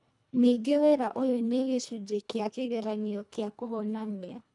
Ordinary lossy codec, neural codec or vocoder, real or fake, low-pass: none; codec, 24 kHz, 1.5 kbps, HILCodec; fake; 10.8 kHz